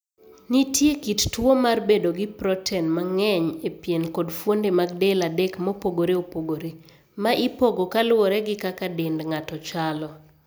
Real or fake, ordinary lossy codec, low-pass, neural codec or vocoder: real; none; none; none